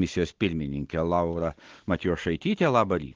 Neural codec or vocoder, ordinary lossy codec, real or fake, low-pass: none; Opus, 16 kbps; real; 7.2 kHz